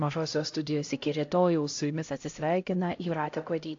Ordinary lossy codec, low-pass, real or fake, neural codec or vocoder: AAC, 48 kbps; 7.2 kHz; fake; codec, 16 kHz, 0.5 kbps, X-Codec, HuBERT features, trained on LibriSpeech